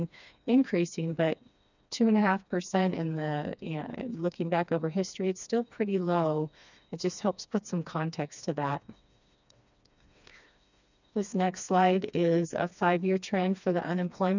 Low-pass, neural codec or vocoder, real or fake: 7.2 kHz; codec, 16 kHz, 2 kbps, FreqCodec, smaller model; fake